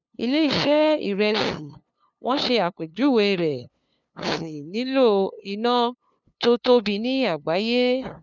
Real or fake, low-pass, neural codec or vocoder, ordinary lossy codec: fake; 7.2 kHz; codec, 16 kHz, 2 kbps, FunCodec, trained on LibriTTS, 25 frames a second; none